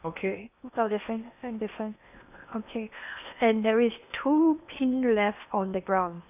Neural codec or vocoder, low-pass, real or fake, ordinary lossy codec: codec, 16 kHz in and 24 kHz out, 0.8 kbps, FocalCodec, streaming, 65536 codes; 3.6 kHz; fake; none